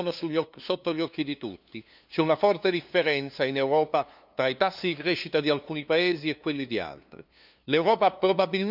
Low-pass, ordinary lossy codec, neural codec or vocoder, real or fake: 5.4 kHz; none; codec, 16 kHz, 2 kbps, FunCodec, trained on LibriTTS, 25 frames a second; fake